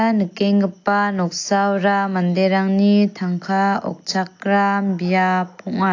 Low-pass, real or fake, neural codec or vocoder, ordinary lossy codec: 7.2 kHz; real; none; AAC, 48 kbps